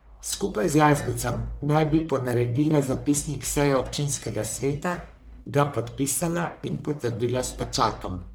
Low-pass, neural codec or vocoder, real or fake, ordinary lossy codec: none; codec, 44.1 kHz, 1.7 kbps, Pupu-Codec; fake; none